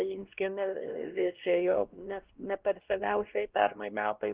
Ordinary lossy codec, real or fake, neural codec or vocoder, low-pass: Opus, 16 kbps; fake; codec, 16 kHz, 1 kbps, X-Codec, WavLM features, trained on Multilingual LibriSpeech; 3.6 kHz